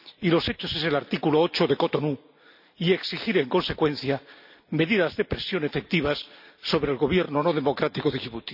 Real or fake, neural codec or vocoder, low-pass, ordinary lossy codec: real; none; 5.4 kHz; none